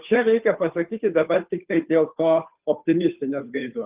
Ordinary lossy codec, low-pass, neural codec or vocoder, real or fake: Opus, 32 kbps; 3.6 kHz; codec, 16 kHz, 2 kbps, FunCodec, trained on Chinese and English, 25 frames a second; fake